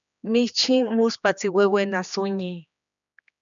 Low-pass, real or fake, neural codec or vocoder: 7.2 kHz; fake; codec, 16 kHz, 2 kbps, X-Codec, HuBERT features, trained on general audio